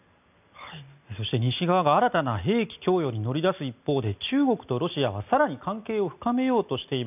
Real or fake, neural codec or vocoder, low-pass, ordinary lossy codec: real; none; 3.6 kHz; none